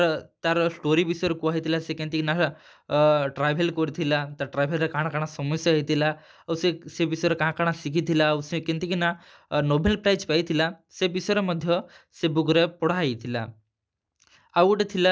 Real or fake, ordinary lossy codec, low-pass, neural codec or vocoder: real; none; none; none